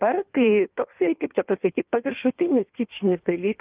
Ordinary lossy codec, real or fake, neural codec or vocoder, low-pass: Opus, 24 kbps; fake; codec, 16 kHz in and 24 kHz out, 1.1 kbps, FireRedTTS-2 codec; 3.6 kHz